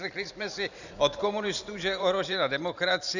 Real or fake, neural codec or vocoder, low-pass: fake; vocoder, 44.1 kHz, 128 mel bands every 512 samples, BigVGAN v2; 7.2 kHz